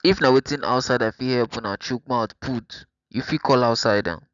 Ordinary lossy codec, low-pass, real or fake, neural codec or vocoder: none; 7.2 kHz; real; none